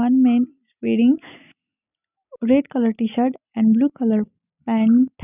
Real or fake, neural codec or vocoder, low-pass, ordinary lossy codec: real; none; 3.6 kHz; none